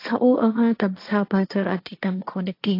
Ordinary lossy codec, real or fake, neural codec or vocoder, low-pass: AAC, 32 kbps; fake; codec, 16 kHz, 1.1 kbps, Voila-Tokenizer; 5.4 kHz